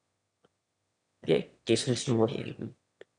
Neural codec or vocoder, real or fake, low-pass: autoencoder, 22.05 kHz, a latent of 192 numbers a frame, VITS, trained on one speaker; fake; 9.9 kHz